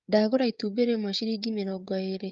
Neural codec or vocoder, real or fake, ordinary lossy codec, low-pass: codec, 16 kHz, 16 kbps, FreqCodec, smaller model; fake; Opus, 32 kbps; 7.2 kHz